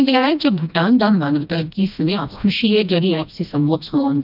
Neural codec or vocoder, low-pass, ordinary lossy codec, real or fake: codec, 16 kHz, 1 kbps, FreqCodec, smaller model; 5.4 kHz; none; fake